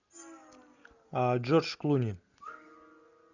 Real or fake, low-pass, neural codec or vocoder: real; 7.2 kHz; none